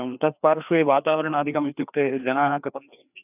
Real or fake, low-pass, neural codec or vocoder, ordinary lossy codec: fake; 3.6 kHz; codec, 16 kHz, 2 kbps, FreqCodec, larger model; none